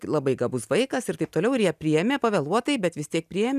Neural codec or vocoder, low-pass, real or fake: none; 14.4 kHz; real